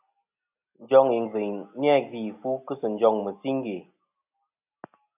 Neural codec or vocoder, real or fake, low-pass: none; real; 3.6 kHz